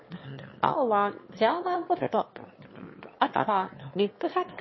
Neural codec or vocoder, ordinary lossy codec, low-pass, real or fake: autoencoder, 22.05 kHz, a latent of 192 numbers a frame, VITS, trained on one speaker; MP3, 24 kbps; 7.2 kHz; fake